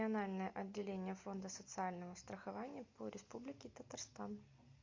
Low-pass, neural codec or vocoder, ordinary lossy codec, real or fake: 7.2 kHz; none; MP3, 48 kbps; real